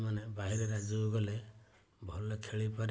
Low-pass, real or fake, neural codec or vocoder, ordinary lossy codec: none; real; none; none